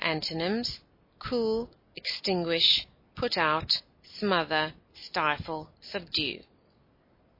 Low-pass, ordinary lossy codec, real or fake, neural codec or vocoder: 5.4 kHz; MP3, 24 kbps; real; none